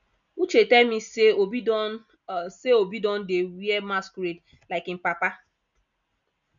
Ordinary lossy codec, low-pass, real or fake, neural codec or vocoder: none; 7.2 kHz; real; none